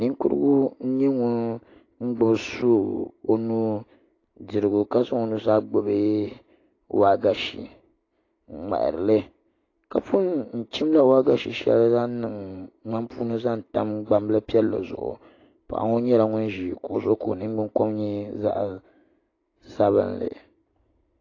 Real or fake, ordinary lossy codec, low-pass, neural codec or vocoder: real; AAC, 32 kbps; 7.2 kHz; none